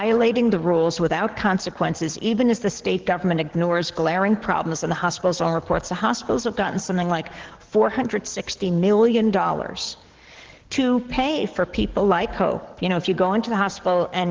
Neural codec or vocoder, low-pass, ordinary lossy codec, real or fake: codec, 44.1 kHz, 7.8 kbps, DAC; 7.2 kHz; Opus, 16 kbps; fake